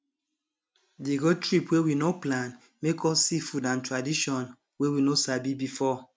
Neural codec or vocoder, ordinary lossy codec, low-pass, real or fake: none; none; none; real